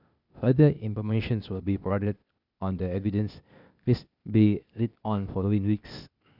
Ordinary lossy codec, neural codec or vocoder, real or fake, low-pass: none; codec, 16 kHz, 0.8 kbps, ZipCodec; fake; 5.4 kHz